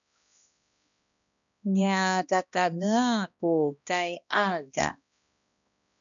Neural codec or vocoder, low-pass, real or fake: codec, 16 kHz, 1 kbps, X-Codec, HuBERT features, trained on balanced general audio; 7.2 kHz; fake